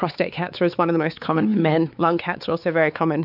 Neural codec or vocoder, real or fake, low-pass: codec, 16 kHz, 4 kbps, X-Codec, WavLM features, trained on Multilingual LibriSpeech; fake; 5.4 kHz